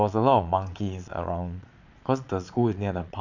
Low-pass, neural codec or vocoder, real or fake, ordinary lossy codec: 7.2 kHz; vocoder, 22.05 kHz, 80 mel bands, Vocos; fake; none